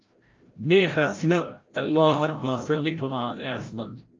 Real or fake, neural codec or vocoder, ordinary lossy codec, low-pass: fake; codec, 16 kHz, 0.5 kbps, FreqCodec, larger model; Opus, 24 kbps; 7.2 kHz